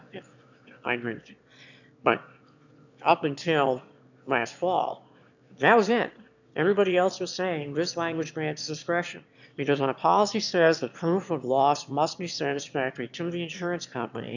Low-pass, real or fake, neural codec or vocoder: 7.2 kHz; fake; autoencoder, 22.05 kHz, a latent of 192 numbers a frame, VITS, trained on one speaker